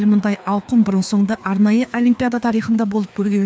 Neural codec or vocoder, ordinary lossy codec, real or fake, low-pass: codec, 16 kHz, 2 kbps, FreqCodec, larger model; none; fake; none